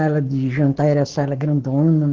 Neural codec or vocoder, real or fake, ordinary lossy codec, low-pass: codec, 44.1 kHz, 7.8 kbps, Pupu-Codec; fake; Opus, 16 kbps; 7.2 kHz